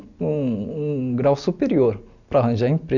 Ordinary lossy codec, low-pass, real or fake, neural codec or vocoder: none; 7.2 kHz; real; none